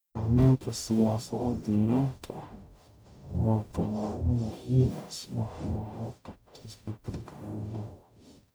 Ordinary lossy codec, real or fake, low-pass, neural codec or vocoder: none; fake; none; codec, 44.1 kHz, 0.9 kbps, DAC